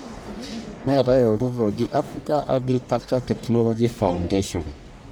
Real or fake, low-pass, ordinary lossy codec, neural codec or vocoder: fake; none; none; codec, 44.1 kHz, 1.7 kbps, Pupu-Codec